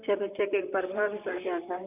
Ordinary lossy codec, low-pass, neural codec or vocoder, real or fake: none; 3.6 kHz; vocoder, 44.1 kHz, 128 mel bands, Pupu-Vocoder; fake